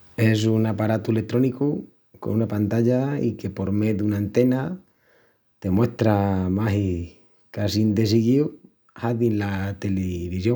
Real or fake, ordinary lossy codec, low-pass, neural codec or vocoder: real; none; none; none